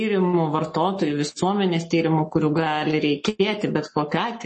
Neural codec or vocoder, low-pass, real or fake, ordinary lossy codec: codec, 44.1 kHz, 7.8 kbps, Pupu-Codec; 10.8 kHz; fake; MP3, 32 kbps